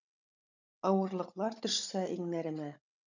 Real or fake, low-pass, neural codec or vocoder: fake; 7.2 kHz; codec, 16 kHz, 16 kbps, FunCodec, trained on Chinese and English, 50 frames a second